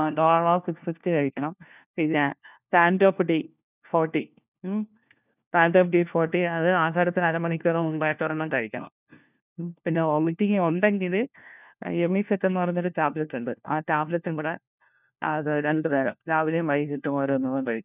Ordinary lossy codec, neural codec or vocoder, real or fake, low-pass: none; codec, 16 kHz, 1 kbps, FunCodec, trained on LibriTTS, 50 frames a second; fake; 3.6 kHz